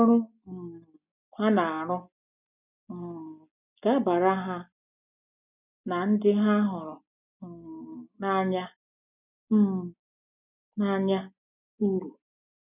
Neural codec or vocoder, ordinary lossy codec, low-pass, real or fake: none; none; 3.6 kHz; real